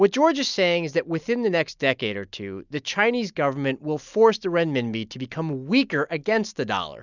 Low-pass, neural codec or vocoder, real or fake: 7.2 kHz; none; real